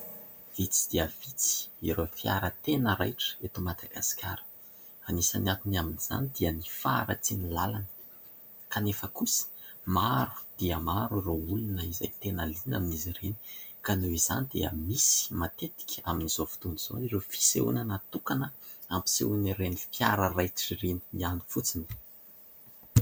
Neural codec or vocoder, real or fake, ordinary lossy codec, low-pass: none; real; MP3, 96 kbps; 19.8 kHz